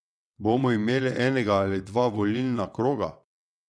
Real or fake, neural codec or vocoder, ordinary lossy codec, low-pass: fake; vocoder, 22.05 kHz, 80 mel bands, WaveNeXt; none; none